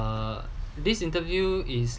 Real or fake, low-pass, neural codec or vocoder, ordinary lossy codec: real; none; none; none